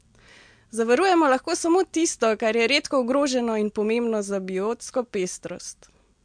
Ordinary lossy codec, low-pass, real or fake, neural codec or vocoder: MP3, 64 kbps; 9.9 kHz; real; none